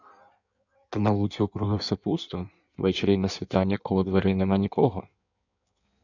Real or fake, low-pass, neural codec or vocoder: fake; 7.2 kHz; codec, 16 kHz in and 24 kHz out, 1.1 kbps, FireRedTTS-2 codec